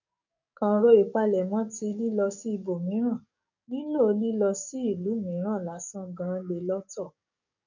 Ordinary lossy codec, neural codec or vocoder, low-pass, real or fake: none; codec, 44.1 kHz, 7.8 kbps, DAC; 7.2 kHz; fake